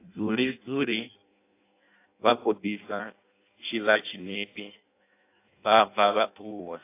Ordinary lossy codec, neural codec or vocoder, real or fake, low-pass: AAC, 24 kbps; codec, 16 kHz in and 24 kHz out, 0.6 kbps, FireRedTTS-2 codec; fake; 3.6 kHz